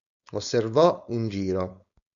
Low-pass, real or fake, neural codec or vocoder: 7.2 kHz; fake; codec, 16 kHz, 4.8 kbps, FACodec